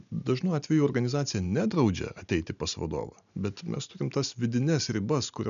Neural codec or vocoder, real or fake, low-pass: none; real; 7.2 kHz